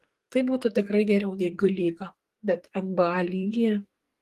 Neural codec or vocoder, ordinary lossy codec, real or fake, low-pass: codec, 44.1 kHz, 2.6 kbps, SNAC; Opus, 24 kbps; fake; 14.4 kHz